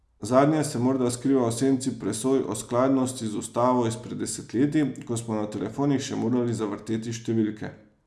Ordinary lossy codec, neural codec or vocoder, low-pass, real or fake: none; none; none; real